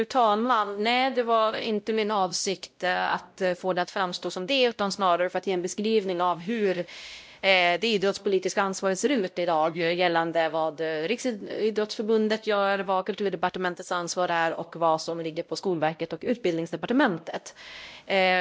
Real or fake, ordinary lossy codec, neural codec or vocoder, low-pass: fake; none; codec, 16 kHz, 0.5 kbps, X-Codec, WavLM features, trained on Multilingual LibriSpeech; none